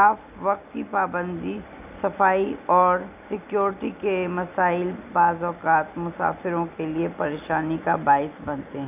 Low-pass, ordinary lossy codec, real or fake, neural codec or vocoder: 3.6 kHz; none; real; none